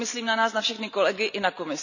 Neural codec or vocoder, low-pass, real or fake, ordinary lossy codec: none; 7.2 kHz; real; none